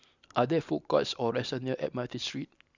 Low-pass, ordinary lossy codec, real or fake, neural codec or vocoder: 7.2 kHz; none; real; none